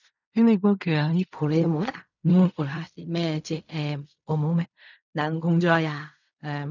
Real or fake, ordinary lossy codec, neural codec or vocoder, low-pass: fake; none; codec, 16 kHz in and 24 kHz out, 0.4 kbps, LongCat-Audio-Codec, fine tuned four codebook decoder; 7.2 kHz